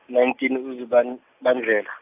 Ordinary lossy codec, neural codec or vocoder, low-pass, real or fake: AAC, 32 kbps; none; 3.6 kHz; real